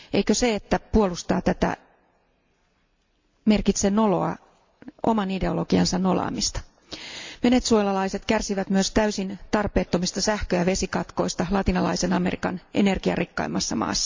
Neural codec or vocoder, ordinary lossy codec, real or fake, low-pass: none; MP3, 64 kbps; real; 7.2 kHz